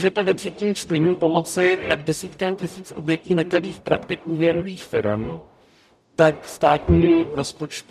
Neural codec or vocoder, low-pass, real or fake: codec, 44.1 kHz, 0.9 kbps, DAC; 14.4 kHz; fake